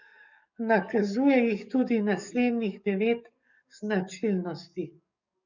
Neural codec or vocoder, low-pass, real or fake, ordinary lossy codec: codec, 44.1 kHz, 7.8 kbps, DAC; 7.2 kHz; fake; none